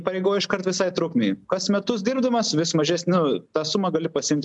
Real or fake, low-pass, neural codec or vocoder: real; 10.8 kHz; none